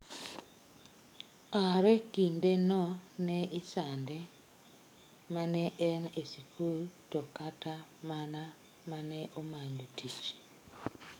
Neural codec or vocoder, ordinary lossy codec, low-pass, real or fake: codec, 44.1 kHz, 7.8 kbps, DAC; none; 19.8 kHz; fake